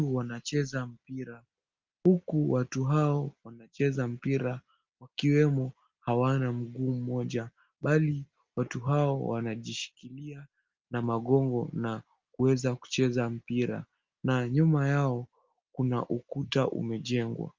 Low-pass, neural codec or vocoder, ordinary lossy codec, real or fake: 7.2 kHz; none; Opus, 32 kbps; real